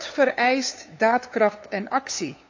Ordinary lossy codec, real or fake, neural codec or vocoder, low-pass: AAC, 48 kbps; fake; codec, 16 kHz, 2 kbps, FunCodec, trained on LibriTTS, 25 frames a second; 7.2 kHz